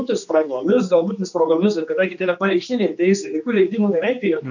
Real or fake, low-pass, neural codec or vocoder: fake; 7.2 kHz; codec, 16 kHz, 2 kbps, X-Codec, HuBERT features, trained on general audio